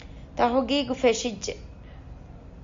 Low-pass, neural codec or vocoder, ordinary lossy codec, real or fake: 7.2 kHz; none; MP3, 48 kbps; real